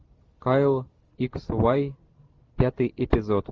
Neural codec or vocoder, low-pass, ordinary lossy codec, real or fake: none; 7.2 kHz; Opus, 32 kbps; real